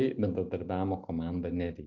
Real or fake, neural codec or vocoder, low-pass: real; none; 7.2 kHz